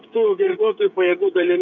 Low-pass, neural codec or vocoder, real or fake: 7.2 kHz; codec, 16 kHz, 16 kbps, FreqCodec, smaller model; fake